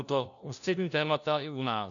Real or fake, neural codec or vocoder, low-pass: fake; codec, 16 kHz, 1 kbps, FunCodec, trained on LibriTTS, 50 frames a second; 7.2 kHz